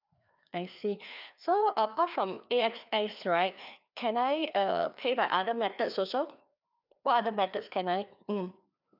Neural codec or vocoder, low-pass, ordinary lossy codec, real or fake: codec, 16 kHz, 2 kbps, FreqCodec, larger model; 5.4 kHz; none; fake